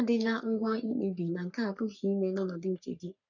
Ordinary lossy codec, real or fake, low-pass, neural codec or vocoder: none; fake; 7.2 kHz; codec, 44.1 kHz, 3.4 kbps, Pupu-Codec